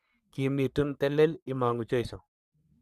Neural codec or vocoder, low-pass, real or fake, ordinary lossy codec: codec, 44.1 kHz, 3.4 kbps, Pupu-Codec; 14.4 kHz; fake; none